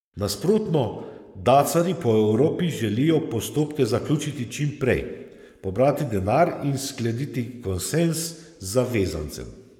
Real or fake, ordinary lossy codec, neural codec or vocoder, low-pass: fake; none; codec, 44.1 kHz, 7.8 kbps, Pupu-Codec; 19.8 kHz